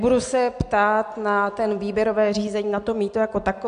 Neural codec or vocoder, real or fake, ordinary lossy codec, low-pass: none; real; MP3, 64 kbps; 9.9 kHz